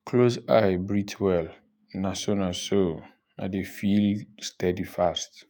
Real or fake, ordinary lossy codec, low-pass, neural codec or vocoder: fake; none; none; autoencoder, 48 kHz, 128 numbers a frame, DAC-VAE, trained on Japanese speech